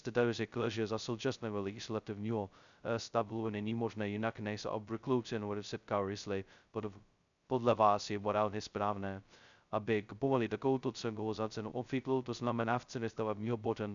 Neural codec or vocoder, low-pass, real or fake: codec, 16 kHz, 0.2 kbps, FocalCodec; 7.2 kHz; fake